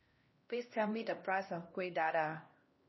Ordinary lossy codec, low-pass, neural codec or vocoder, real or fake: MP3, 24 kbps; 7.2 kHz; codec, 16 kHz, 1 kbps, X-Codec, HuBERT features, trained on LibriSpeech; fake